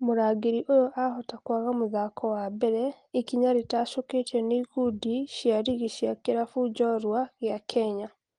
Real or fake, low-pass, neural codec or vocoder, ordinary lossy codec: real; 9.9 kHz; none; Opus, 24 kbps